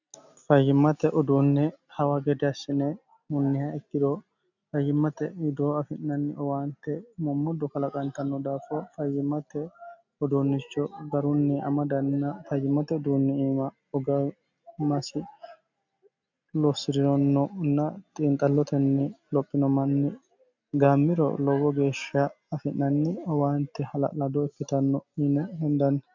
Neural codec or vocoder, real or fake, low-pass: none; real; 7.2 kHz